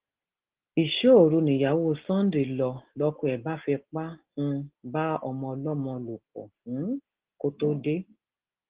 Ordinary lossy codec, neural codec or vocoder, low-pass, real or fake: Opus, 16 kbps; none; 3.6 kHz; real